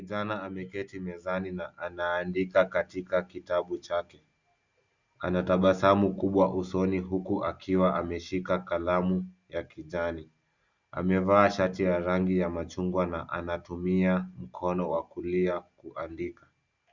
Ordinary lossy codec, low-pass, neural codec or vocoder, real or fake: Opus, 64 kbps; 7.2 kHz; none; real